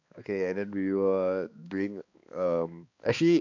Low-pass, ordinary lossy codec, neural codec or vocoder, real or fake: 7.2 kHz; AAC, 48 kbps; codec, 16 kHz, 2 kbps, X-Codec, HuBERT features, trained on balanced general audio; fake